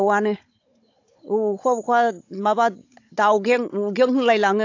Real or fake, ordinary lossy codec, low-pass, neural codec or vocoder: fake; AAC, 48 kbps; 7.2 kHz; codec, 16 kHz, 16 kbps, FunCodec, trained on Chinese and English, 50 frames a second